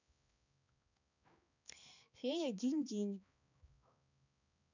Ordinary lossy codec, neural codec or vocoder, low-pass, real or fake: none; codec, 16 kHz, 2 kbps, X-Codec, HuBERT features, trained on balanced general audio; 7.2 kHz; fake